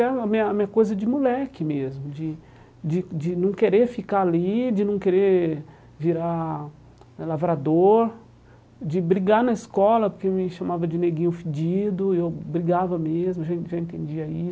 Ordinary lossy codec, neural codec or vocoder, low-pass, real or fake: none; none; none; real